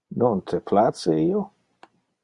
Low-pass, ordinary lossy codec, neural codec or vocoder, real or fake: 10.8 kHz; Opus, 64 kbps; none; real